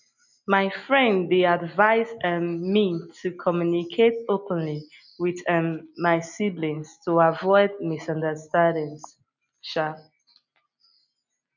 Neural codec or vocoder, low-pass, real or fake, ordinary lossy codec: none; 7.2 kHz; real; none